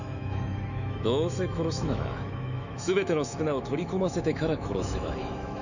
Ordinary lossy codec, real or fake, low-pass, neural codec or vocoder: none; fake; 7.2 kHz; autoencoder, 48 kHz, 128 numbers a frame, DAC-VAE, trained on Japanese speech